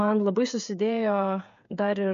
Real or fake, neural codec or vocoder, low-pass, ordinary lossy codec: fake; codec, 16 kHz, 8 kbps, FreqCodec, smaller model; 7.2 kHz; MP3, 64 kbps